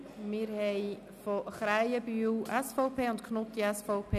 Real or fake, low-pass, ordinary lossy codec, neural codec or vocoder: real; 14.4 kHz; none; none